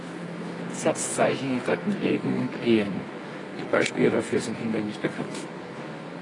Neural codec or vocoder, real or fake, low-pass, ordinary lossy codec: codec, 24 kHz, 0.9 kbps, WavTokenizer, medium music audio release; fake; 10.8 kHz; AAC, 32 kbps